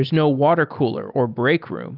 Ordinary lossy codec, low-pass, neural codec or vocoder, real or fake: Opus, 24 kbps; 5.4 kHz; none; real